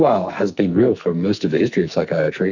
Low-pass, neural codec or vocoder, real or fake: 7.2 kHz; codec, 44.1 kHz, 2.6 kbps, SNAC; fake